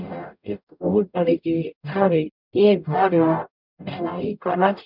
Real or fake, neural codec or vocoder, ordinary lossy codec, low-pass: fake; codec, 44.1 kHz, 0.9 kbps, DAC; none; 5.4 kHz